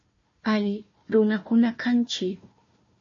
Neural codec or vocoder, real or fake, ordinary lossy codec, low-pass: codec, 16 kHz, 1 kbps, FunCodec, trained on Chinese and English, 50 frames a second; fake; MP3, 32 kbps; 7.2 kHz